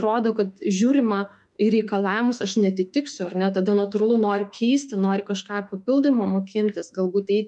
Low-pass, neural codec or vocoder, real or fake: 10.8 kHz; autoencoder, 48 kHz, 32 numbers a frame, DAC-VAE, trained on Japanese speech; fake